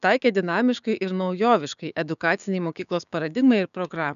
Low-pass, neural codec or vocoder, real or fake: 7.2 kHz; codec, 16 kHz, 6 kbps, DAC; fake